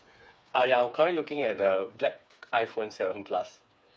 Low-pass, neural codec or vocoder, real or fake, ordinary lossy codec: none; codec, 16 kHz, 4 kbps, FreqCodec, smaller model; fake; none